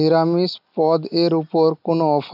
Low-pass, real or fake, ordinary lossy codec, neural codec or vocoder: 5.4 kHz; real; none; none